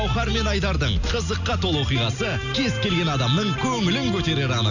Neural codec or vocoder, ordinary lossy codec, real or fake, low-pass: none; none; real; 7.2 kHz